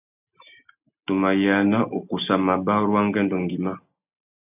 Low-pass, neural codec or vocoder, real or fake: 3.6 kHz; none; real